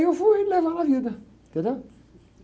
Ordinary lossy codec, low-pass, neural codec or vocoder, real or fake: none; none; none; real